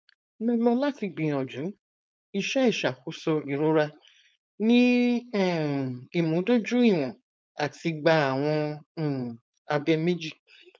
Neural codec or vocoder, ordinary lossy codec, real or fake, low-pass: codec, 16 kHz, 4.8 kbps, FACodec; none; fake; none